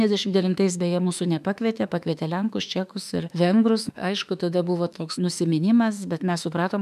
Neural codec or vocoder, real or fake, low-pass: autoencoder, 48 kHz, 32 numbers a frame, DAC-VAE, trained on Japanese speech; fake; 14.4 kHz